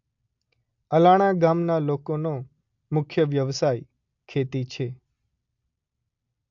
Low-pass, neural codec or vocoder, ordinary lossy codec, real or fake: 7.2 kHz; none; AAC, 64 kbps; real